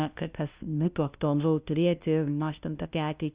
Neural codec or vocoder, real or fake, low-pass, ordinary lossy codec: codec, 16 kHz, 0.5 kbps, FunCodec, trained on LibriTTS, 25 frames a second; fake; 3.6 kHz; Opus, 64 kbps